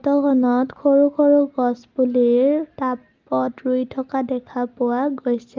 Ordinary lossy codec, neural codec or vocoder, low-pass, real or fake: Opus, 24 kbps; none; 7.2 kHz; real